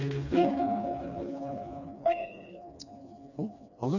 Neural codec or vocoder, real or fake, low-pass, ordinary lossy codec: codec, 16 kHz, 2 kbps, FreqCodec, smaller model; fake; 7.2 kHz; none